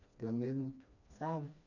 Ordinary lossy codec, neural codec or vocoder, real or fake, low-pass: none; codec, 16 kHz, 2 kbps, FreqCodec, smaller model; fake; 7.2 kHz